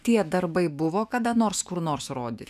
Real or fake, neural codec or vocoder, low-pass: fake; autoencoder, 48 kHz, 128 numbers a frame, DAC-VAE, trained on Japanese speech; 14.4 kHz